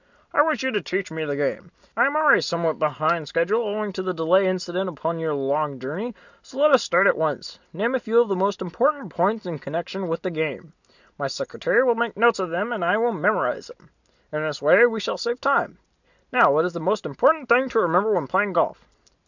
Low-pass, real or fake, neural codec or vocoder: 7.2 kHz; real; none